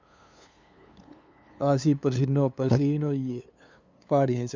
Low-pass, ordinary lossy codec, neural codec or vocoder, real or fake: none; none; codec, 16 kHz, 2 kbps, FunCodec, trained on LibriTTS, 25 frames a second; fake